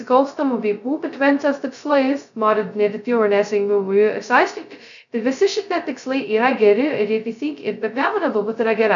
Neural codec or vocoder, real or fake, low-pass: codec, 16 kHz, 0.2 kbps, FocalCodec; fake; 7.2 kHz